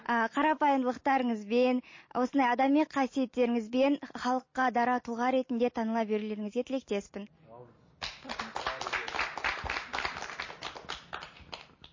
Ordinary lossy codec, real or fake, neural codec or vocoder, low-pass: MP3, 32 kbps; real; none; 7.2 kHz